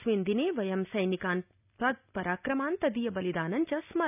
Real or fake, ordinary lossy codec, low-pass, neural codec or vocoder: real; none; 3.6 kHz; none